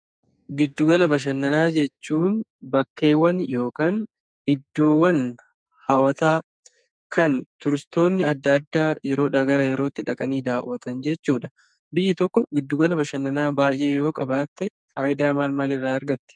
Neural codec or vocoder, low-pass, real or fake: codec, 44.1 kHz, 2.6 kbps, SNAC; 9.9 kHz; fake